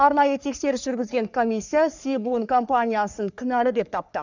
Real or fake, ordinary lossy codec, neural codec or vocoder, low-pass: fake; none; codec, 44.1 kHz, 3.4 kbps, Pupu-Codec; 7.2 kHz